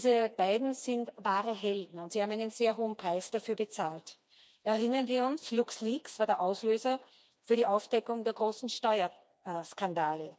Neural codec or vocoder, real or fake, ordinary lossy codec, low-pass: codec, 16 kHz, 2 kbps, FreqCodec, smaller model; fake; none; none